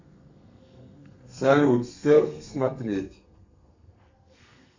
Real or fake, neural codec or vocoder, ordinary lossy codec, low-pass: fake; codec, 32 kHz, 1.9 kbps, SNAC; MP3, 64 kbps; 7.2 kHz